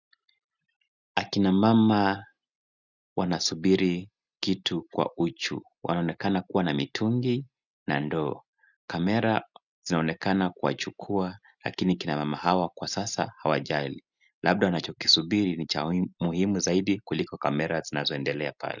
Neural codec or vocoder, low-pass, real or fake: none; 7.2 kHz; real